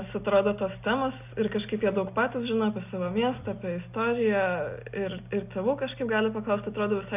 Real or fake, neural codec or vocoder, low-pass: real; none; 3.6 kHz